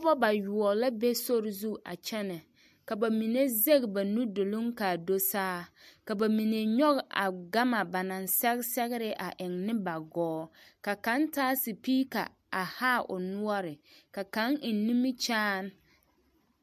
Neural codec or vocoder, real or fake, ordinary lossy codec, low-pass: none; real; MP3, 64 kbps; 14.4 kHz